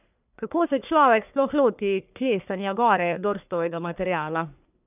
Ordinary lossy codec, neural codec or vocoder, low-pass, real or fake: none; codec, 44.1 kHz, 1.7 kbps, Pupu-Codec; 3.6 kHz; fake